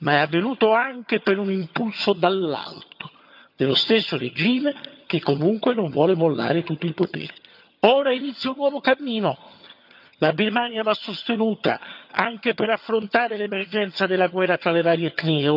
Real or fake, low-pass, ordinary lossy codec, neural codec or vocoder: fake; 5.4 kHz; none; vocoder, 22.05 kHz, 80 mel bands, HiFi-GAN